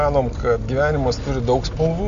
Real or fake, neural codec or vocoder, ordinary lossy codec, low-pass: real; none; AAC, 96 kbps; 7.2 kHz